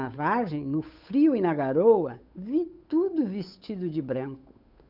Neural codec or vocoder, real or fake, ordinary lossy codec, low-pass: codec, 16 kHz, 8 kbps, FunCodec, trained on Chinese and English, 25 frames a second; fake; none; 5.4 kHz